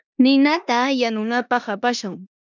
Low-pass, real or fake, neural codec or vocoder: 7.2 kHz; fake; codec, 16 kHz in and 24 kHz out, 0.9 kbps, LongCat-Audio-Codec, four codebook decoder